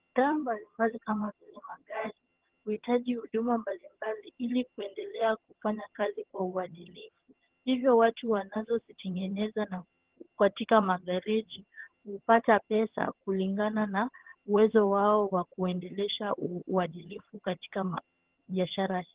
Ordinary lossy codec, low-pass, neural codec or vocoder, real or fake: Opus, 16 kbps; 3.6 kHz; vocoder, 22.05 kHz, 80 mel bands, HiFi-GAN; fake